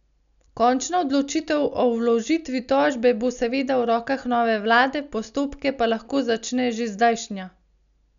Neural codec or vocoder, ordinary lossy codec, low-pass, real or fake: none; none; 7.2 kHz; real